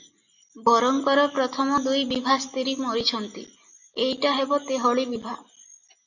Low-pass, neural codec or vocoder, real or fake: 7.2 kHz; none; real